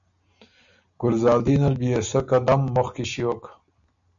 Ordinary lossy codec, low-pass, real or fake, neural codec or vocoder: MP3, 96 kbps; 7.2 kHz; real; none